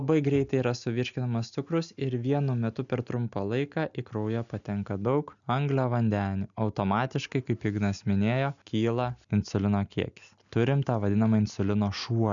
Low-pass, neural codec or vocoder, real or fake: 7.2 kHz; none; real